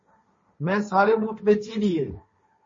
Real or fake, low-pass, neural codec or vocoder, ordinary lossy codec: fake; 7.2 kHz; codec, 16 kHz, 1.1 kbps, Voila-Tokenizer; MP3, 32 kbps